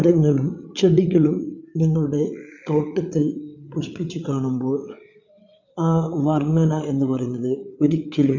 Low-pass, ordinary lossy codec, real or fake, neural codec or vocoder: 7.2 kHz; none; fake; codec, 16 kHz, 8 kbps, FreqCodec, larger model